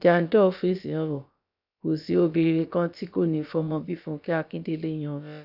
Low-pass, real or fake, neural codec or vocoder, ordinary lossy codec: 5.4 kHz; fake; codec, 16 kHz, about 1 kbps, DyCAST, with the encoder's durations; none